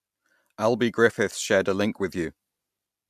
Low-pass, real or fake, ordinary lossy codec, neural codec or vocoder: 14.4 kHz; real; MP3, 96 kbps; none